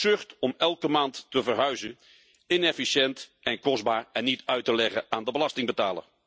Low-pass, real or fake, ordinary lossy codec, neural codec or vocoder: none; real; none; none